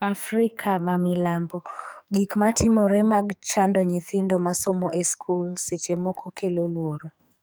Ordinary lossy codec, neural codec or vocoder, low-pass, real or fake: none; codec, 44.1 kHz, 2.6 kbps, SNAC; none; fake